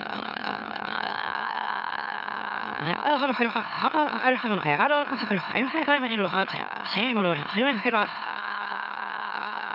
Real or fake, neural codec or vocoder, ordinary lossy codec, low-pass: fake; autoencoder, 44.1 kHz, a latent of 192 numbers a frame, MeloTTS; none; 5.4 kHz